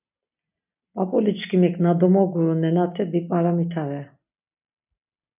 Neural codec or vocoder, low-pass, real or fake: none; 3.6 kHz; real